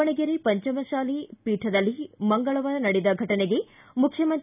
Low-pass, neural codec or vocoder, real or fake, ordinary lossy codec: 3.6 kHz; none; real; none